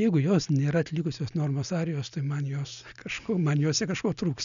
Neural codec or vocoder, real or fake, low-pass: none; real; 7.2 kHz